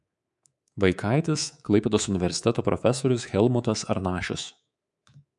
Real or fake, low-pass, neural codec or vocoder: fake; 10.8 kHz; codec, 24 kHz, 3.1 kbps, DualCodec